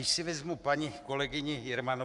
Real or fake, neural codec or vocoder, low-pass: fake; codec, 44.1 kHz, 7.8 kbps, Pupu-Codec; 10.8 kHz